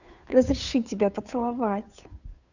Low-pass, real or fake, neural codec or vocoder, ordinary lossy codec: 7.2 kHz; fake; codec, 16 kHz, 2 kbps, FunCodec, trained on Chinese and English, 25 frames a second; none